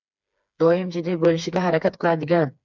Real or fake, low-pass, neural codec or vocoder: fake; 7.2 kHz; codec, 16 kHz, 4 kbps, FreqCodec, smaller model